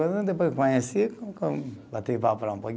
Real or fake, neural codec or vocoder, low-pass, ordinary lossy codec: real; none; none; none